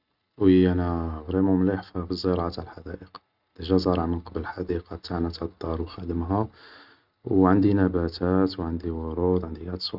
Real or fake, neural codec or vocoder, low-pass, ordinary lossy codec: real; none; 5.4 kHz; Opus, 64 kbps